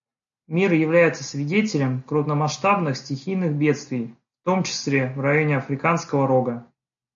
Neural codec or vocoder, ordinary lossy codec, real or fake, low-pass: none; AAC, 64 kbps; real; 7.2 kHz